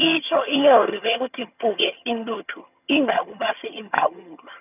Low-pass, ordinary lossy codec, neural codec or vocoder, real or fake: 3.6 kHz; none; vocoder, 22.05 kHz, 80 mel bands, HiFi-GAN; fake